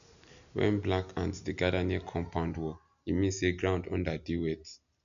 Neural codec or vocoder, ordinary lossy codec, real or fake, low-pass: none; none; real; 7.2 kHz